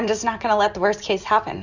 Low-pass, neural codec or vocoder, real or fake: 7.2 kHz; none; real